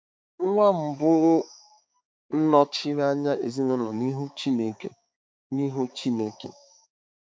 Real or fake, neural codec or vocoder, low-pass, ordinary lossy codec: fake; codec, 16 kHz, 4 kbps, X-Codec, HuBERT features, trained on balanced general audio; none; none